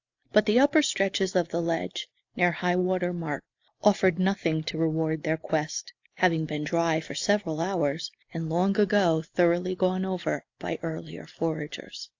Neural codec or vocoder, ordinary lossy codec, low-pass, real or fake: none; AAC, 48 kbps; 7.2 kHz; real